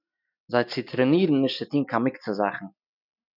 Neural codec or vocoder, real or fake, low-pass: none; real; 5.4 kHz